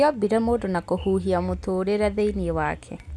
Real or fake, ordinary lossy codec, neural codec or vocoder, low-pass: real; none; none; none